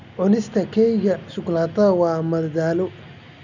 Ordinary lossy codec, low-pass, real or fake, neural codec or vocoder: none; 7.2 kHz; real; none